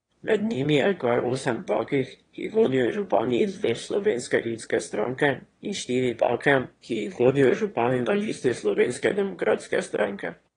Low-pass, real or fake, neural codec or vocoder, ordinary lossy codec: 9.9 kHz; fake; autoencoder, 22.05 kHz, a latent of 192 numbers a frame, VITS, trained on one speaker; AAC, 32 kbps